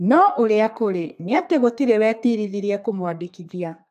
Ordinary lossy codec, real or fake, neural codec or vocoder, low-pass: AAC, 96 kbps; fake; codec, 32 kHz, 1.9 kbps, SNAC; 14.4 kHz